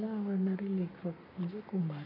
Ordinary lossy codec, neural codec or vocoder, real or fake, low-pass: none; codec, 44.1 kHz, 7.8 kbps, Pupu-Codec; fake; 5.4 kHz